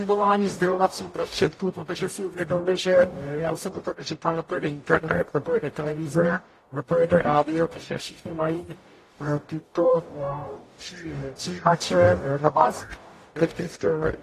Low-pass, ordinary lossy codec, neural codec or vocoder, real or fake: 14.4 kHz; AAC, 48 kbps; codec, 44.1 kHz, 0.9 kbps, DAC; fake